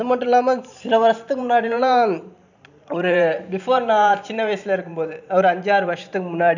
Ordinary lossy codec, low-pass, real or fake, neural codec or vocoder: none; 7.2 kHz; fake; vocoder, 44.1 kHz, 128 mel bands every 512 samples, BigVGAN v2